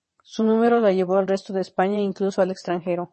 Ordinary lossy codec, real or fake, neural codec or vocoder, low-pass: MP3, 32 kbps; fake; vocoder, 22.05 kHz, 80 mel bands, WaveNeXt; 9.9 kHz